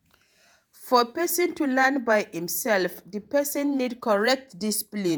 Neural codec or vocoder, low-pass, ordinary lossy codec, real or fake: vocoder, 48 kHz, 128 mel bands, Vocos; none; none; fake